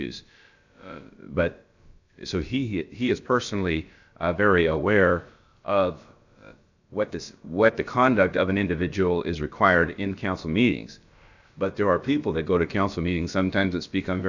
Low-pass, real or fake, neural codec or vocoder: 7.2 kHz; fake; codec, 16 kHz, about 1 kbps, DyCAST, with the encoder's durations